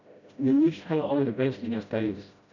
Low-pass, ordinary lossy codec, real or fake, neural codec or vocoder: 7.2 kHz; none; fake; codec, 16 kHz, 0.5 kbps, FreqCodec, smaller model